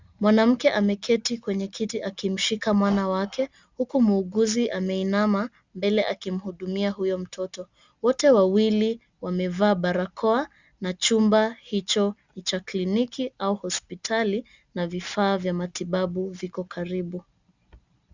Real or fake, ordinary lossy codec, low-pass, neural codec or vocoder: real; Opus, 64 kbps; 7.2 kHz; none